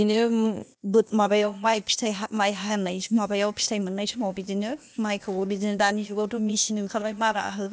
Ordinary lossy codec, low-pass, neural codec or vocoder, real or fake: none; none; codec, 16 kHz, 0.8 kbps, ZipCodec; fake